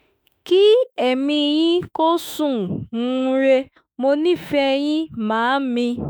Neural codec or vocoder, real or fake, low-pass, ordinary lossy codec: autoencoder, 48 kHz, 32 numbers a frame, DAC-VAE, trained on Japanese speech; fake; none; none